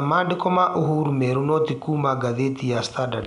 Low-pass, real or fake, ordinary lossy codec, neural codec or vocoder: 10.8 kHz; real; none; none